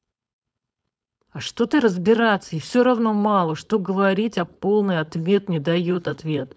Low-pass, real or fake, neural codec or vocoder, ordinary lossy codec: none; fake; codec, 16 kHz, 4.8 kbps, FACodec; none